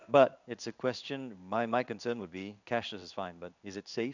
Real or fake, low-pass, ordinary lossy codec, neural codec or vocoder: fake; 7.2 kHz; none; codec, 16 kHz in and 24 kHz out, 1 kbps, XY-Tokenizer